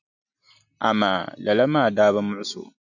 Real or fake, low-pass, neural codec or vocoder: real; 7.2 kHz; none